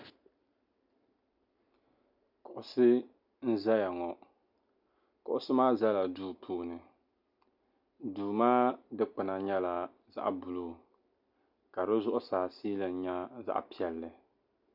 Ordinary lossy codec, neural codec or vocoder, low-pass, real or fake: MP3, 48 kbps; none; 5.4 kHz; real